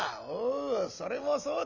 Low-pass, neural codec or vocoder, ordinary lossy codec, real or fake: 7.2 kHz; none; none; real